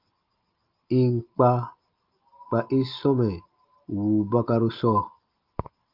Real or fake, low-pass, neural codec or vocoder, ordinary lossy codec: real; 5.4 kHz; none; Opus, 24 kbps